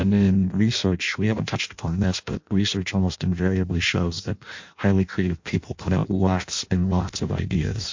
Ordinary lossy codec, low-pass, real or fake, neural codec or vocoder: MP3, 48 kbps; 7.2 kHz; fake; codec, 16 kHz in and 24 kHz out, 0.6 kbps, FireRedTTS-2 codec